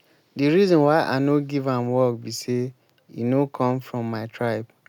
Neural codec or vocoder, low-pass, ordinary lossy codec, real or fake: none; 19.8 kHz; none; real